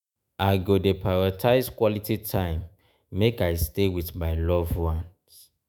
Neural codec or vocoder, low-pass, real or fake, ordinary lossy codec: autoencoder, 48 kHz, 128 numbers a frame, DAC-VAE, trained on Japanese speech; none; fake; none